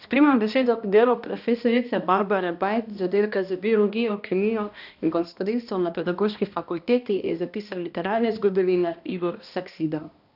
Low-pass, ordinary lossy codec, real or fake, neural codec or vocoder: 5.4 kHz; none; fake; codec, 16 kHz, 1 kbps, X-Codec, HuBERT features, trained on balanced general audio